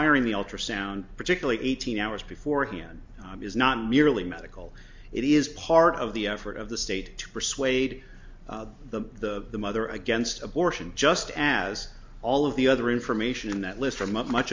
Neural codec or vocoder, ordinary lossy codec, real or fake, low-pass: none; MP3, 64 kbps; real; 7.2 kHz